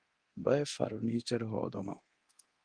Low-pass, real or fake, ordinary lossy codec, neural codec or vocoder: 9.9 kHz; fake; Opus, 24 kbps; codec, 24 kHz, 0.9 kbps, DualCodec